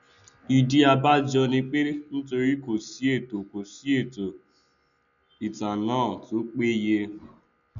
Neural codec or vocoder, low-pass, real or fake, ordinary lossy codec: none; 7.2 kHz; real; none